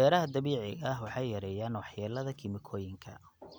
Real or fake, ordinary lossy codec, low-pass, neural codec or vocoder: real; none; none; none